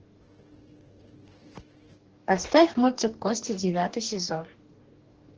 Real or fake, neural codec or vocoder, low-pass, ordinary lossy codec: fake; codec, 44.1 kHz, 2.6 kbps, DAC; 7.2 kHz; Opus, 16 kbps